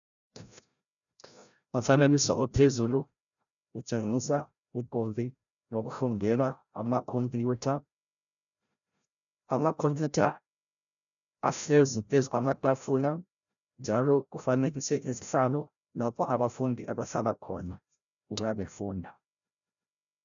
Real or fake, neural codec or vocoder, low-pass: fake; codec, 16 kHz, 0.5 kbps, FreqCodec, larger model; 7.2 kHz